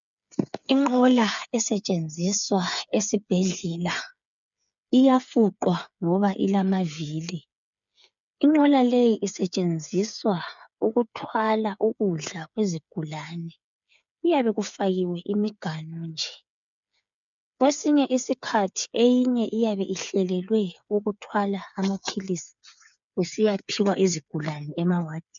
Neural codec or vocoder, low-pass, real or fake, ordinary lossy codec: codec, 16 kHz, 8 kbps, FreqCodec, smaller model; 7.2 kHz; fake; MP3, 96 kbps